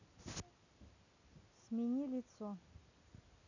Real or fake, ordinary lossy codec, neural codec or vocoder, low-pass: real; none; none; 7.2 kHz